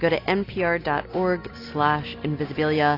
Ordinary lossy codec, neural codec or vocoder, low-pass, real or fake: AAC, 24 kbps; none; 5.4 kHz; real